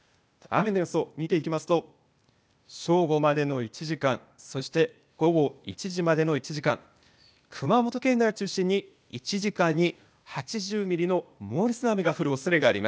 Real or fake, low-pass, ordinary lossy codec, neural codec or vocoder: fake; none; none; codec, 16 kHz, 0.8 kbps, ZipCodec